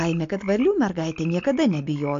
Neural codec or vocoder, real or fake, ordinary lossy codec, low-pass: none; real; AAC, 48 kbps; 7.2 kHz